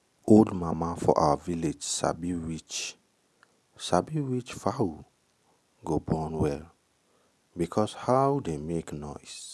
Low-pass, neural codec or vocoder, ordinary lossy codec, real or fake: none; none; none; real